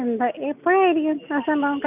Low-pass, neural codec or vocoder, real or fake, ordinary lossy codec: 3.6 kHz; none; real; none